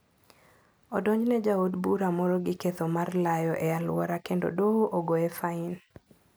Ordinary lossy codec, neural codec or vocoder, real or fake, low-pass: none; none; real; none